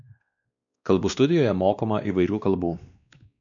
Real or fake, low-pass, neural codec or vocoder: fake; 7.2 kHz; codec, 16 kHz, 2 kbps, X-Codec, WavLM features, trained on Multilingual LibriSpeech